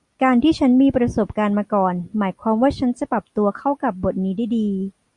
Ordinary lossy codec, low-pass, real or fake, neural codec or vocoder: Opus, 64 kbps; 10.8 kHz; real; none